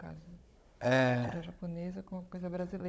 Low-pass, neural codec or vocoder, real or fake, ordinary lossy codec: none; codec, 16 kHz, 16 kbps, FunCodec, trained on Chinese and English, 50 frames a second; fake; none